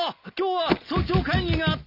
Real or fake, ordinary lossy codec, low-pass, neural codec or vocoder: real; none; 5.4 kHz; none